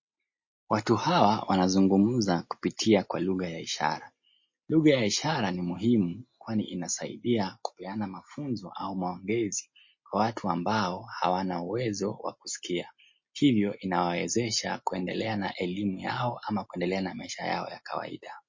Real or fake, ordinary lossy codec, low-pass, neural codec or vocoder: real; MP3, 32 kbps; 7.2 kHz; none